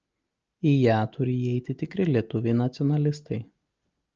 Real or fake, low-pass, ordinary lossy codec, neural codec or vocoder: real; 7.2 kHz; Opus, 24 kbps; none